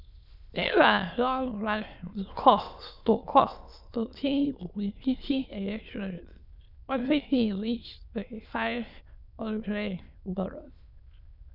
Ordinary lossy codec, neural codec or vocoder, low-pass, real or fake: none; autoencoder, 22.05 kHz, a latent of 192 numbers a frame, VITS, trained on many speakers; 5.4 kHz; fake